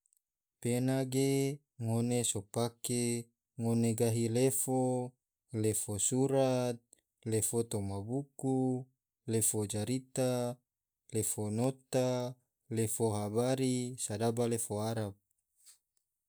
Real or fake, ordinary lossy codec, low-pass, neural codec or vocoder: real; none; none; none